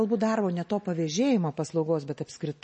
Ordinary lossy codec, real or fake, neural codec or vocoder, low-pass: MP3, 32 kbps; real; none; 10.8 kHz